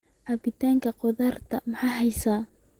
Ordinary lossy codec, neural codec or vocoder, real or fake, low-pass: Opus, 16 kbps; none; real; 19.8 kHz